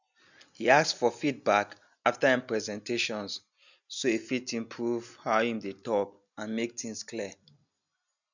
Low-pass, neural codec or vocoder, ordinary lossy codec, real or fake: 7.2 kHz; none; none; real